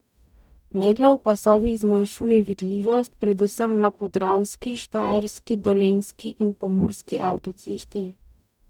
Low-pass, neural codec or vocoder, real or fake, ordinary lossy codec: 19.8 kHz; codec, 44.1 kHz, 0.9 kbps, DAC; fake; none